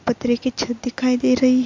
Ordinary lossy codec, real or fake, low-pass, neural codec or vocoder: MP3, 48 kbps; real; 7.2 kHz; none